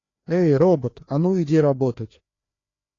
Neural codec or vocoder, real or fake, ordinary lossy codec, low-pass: codec, 16 kHz, 2 kbps, FreqCodec, larger model; fake; AAC, 32 kbps; 7.2 kHz